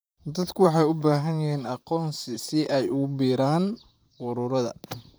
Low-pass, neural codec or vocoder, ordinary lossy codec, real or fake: none; vocoder, 44.1 kHz, 128 mel bands, Pupu-Vocoder; none; fake